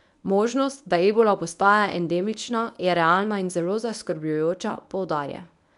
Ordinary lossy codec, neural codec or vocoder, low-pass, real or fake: none; codec, 24 kHz, 0.9 kbps, WavTokenizer, medium speech release version 1; 10.8 kHz; fake